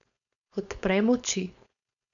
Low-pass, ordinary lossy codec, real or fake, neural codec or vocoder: 7.2 kHz; none; fake; codec, 16 kHz, 4.8 kbps, FACodec